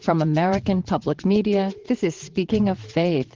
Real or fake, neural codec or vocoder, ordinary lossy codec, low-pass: fake; vocoder, 22.05 kHz, 80 mel bands, WaveNeXt; Opus, 16 kbps; 7.2 kHz